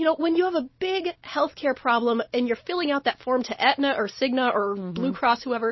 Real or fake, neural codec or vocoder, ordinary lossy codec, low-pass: real; none; MP3, 24 kbps; 7.2 kHz